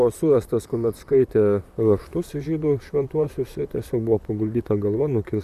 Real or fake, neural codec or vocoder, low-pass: fake; vocoder, 44.1 kHz, 128 mel bands, Pupu-Vocoder; 14.4 kHz